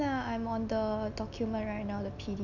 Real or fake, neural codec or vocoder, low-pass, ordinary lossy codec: real; none; 7.2 kHz; none